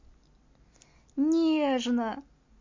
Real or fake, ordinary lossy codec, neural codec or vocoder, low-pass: real; MP3, 48 kbps; none; 7.2 kHz